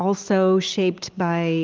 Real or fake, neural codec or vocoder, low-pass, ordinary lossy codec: real; none; 7.2 kHz; Opus, 32 kbps